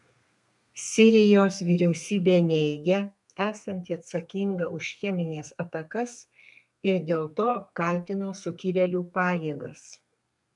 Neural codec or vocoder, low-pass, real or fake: codec, 32 kHz, 1.9 kbps, SNAC; 10.8 kHz; fake